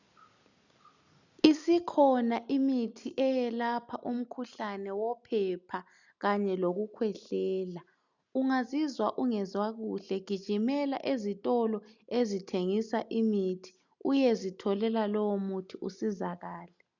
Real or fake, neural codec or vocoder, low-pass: real; none; 7.2 kHz